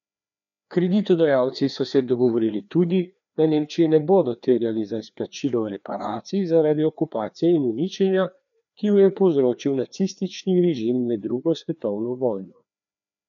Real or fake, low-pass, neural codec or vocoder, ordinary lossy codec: fake; 7.2 kHz; codec, 16 kHz, 2 kbps, FreqCodec, larger model; none